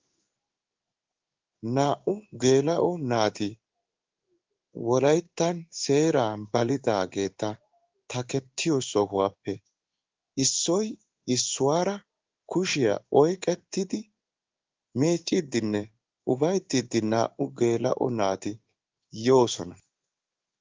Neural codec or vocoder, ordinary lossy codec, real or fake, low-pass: codec, 16 kHz in and 24 kHz out, 1 kbps, XY-Tokenizer; Opus, 32 kbps; fake; 7.2 kHz